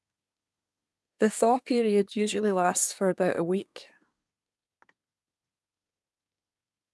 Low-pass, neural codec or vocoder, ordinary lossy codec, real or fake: none; codec, 24 kHz, 1 kbps, SNAC; none; fake